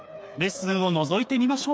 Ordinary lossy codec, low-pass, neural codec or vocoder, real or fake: none; none; codec, 16 kHz, 4 kbps, FreqCodec, smaller model; fake